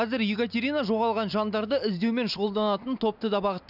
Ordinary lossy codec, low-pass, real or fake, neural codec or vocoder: none; 5.4 kHz; real; none